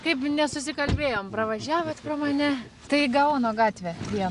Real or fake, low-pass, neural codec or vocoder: real; 10.8 kHz; none